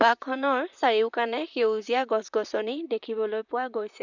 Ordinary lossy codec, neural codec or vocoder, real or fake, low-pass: none; vocoder, 44.1 kHz, 128 mel bands, Pupu-Vocoder; fake; 7.2 kHz